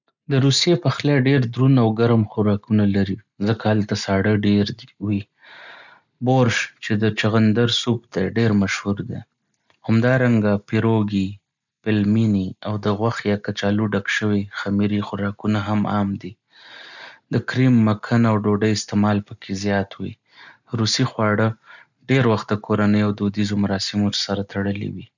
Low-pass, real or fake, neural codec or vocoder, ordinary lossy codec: 7.2 kHz; real; none; none